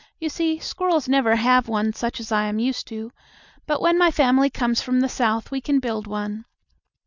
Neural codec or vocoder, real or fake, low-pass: none; real; 7.2 kHz